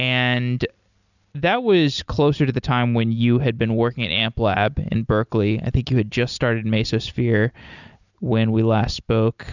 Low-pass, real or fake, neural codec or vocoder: 7.2 kHz; real; none